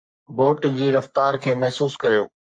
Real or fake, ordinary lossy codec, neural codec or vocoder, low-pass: fake; MP3, 48 kbps; codec, 44.1 kHz, 3.4 kbps, Pupu-Codec; 9.9 kHz